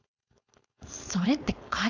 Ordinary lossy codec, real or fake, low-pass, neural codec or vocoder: none; fake; 7.2 kHz; codec, 16 kHz, 4.8 kbps, FACodec